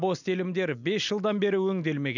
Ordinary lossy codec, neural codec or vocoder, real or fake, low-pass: none; none; real; 7.2 kHz